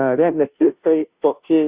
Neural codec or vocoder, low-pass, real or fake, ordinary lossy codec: codec, 16 kHz, 0.5 kbps, FunCodec, trained on Chinese and English, 25 frames a second; 3.6 kHz; fake; Opus, 64 kbps